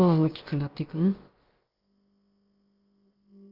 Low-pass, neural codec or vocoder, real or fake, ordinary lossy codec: 5.4 kHz; codec, 16 kHz, about 1 kbps, DyCAST, with the encoder's durations; fake; Opus, 32 kbps